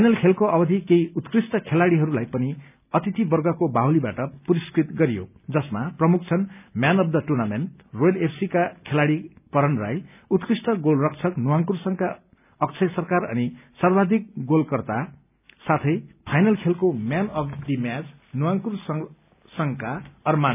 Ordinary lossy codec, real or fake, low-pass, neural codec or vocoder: none; real; 3.6 kHz; none